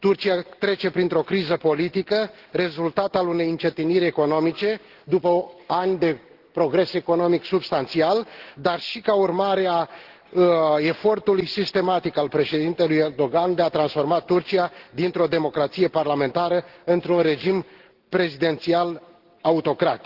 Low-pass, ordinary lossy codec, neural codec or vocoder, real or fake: 5.4 kHz; Opus, 16 kbps; none; real